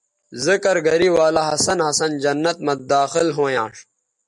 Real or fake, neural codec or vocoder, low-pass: real; none; 10.8 kHz